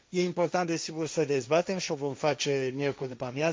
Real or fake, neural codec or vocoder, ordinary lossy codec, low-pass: fake; codec, 16 kHz, 1.1 kbps, Voila-Tokenizer; none; none